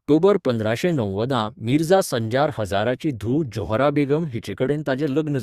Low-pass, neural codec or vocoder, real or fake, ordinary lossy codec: 14.4 kHz; codec, 32 kHz, 1.9 kbps, SNAC; fake; Opus, 64 kbps